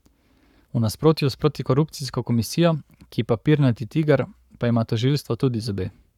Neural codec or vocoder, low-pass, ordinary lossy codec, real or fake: codec, 44.1 kHz, 7.8 kbps, Pupu-Codec; 19.8 kHz; none; fake